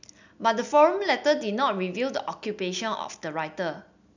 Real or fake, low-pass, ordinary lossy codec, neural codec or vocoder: real; 7.2 kHz; none; none